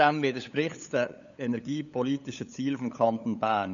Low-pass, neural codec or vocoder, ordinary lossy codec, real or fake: 7.2 kHz; codec, 16 kHz, 8 kbps, FunCodec, trained on LibriTTS, 25 frames a second; none; fake